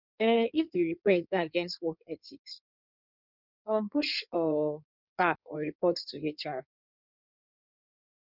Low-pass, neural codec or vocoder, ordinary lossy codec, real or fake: 5.4 kHz; codec, 16 kHz in and 24 kHz out, 1.1 kbps, FireRedTTS-2 codec; none; fake